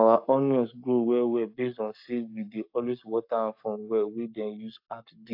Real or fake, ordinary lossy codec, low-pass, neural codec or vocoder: fake; AAC, 48 kbps; 5.4 kHz; codec, 44.1 kHz, 7.8 kbps, Pupu-Codec